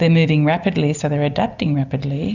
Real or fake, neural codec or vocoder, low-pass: real; none; 7.2 kHz